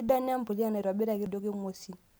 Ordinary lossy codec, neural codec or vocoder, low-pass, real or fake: none; none; none; real